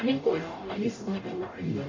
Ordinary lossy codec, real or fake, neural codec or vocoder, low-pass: AAC, 32 kbps; fake; codec, 44.1 kHz, 0.9 kbps, DAC; 7.2 kHz